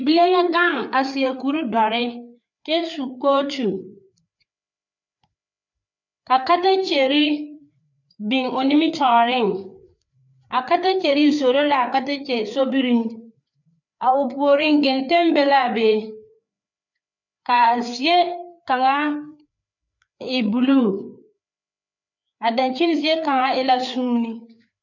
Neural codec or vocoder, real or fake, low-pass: codec, 16 kHz, 4 kbps, FreqCodec, larger model; fake; 7.2 kHz